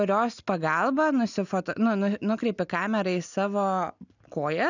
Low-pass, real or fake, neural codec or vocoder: 7.2 kHz; real; none